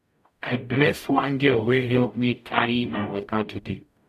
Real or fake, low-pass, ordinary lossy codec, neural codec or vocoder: fake; 14.4 kHz; none; codec, 44.1 kHz, 0.9 kbps, DAC